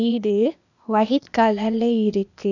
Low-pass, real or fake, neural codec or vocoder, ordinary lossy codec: 7.2 kHz; fake; codec, 16 kHz, 0.8 kbps, ZipCodec; none